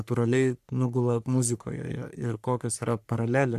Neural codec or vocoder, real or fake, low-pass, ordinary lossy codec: codec, 44.1 kHz, 3.4 kbps, Pupu-Codec; fake; 14.4 kHz; AAC, 96 kbps